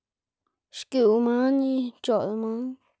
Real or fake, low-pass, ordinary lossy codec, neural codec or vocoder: real; none; none; none